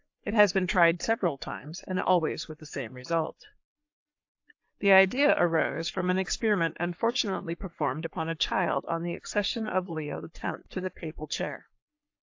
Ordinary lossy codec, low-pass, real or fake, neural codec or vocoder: AAC, 48 kbps; 7.2 kHz; fake; codec, 44.1 kHz, 3.4 kbps, Pupu-Codec